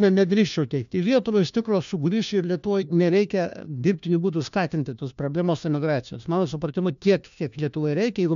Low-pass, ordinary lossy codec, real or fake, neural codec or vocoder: 7.2 kHz; MP3, 96 kbps; fake; codec, 16 kHz, 1 kbps, FunCodec, trained on LibriTTS, 50 frames a second